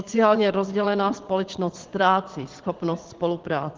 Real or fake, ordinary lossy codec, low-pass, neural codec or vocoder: fake; Opus, 16 kbps; 7.2 kHz; vocoder, 22.05 kHz, 80 mel bands, Vocos